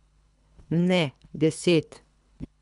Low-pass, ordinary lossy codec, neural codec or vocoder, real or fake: 10.8 kHz; none; codec, 24 kHz, 3 kbps, HILCodec; fake